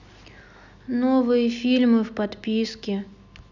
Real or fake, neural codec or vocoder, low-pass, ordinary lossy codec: real; none; 7.2 kHz; none